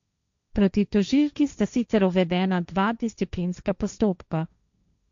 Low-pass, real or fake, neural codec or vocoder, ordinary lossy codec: 7.2 kHz; fake; codec, 16 kHz, 1.1 kbps, Voila-Tokenizer; MP3, 64 kbps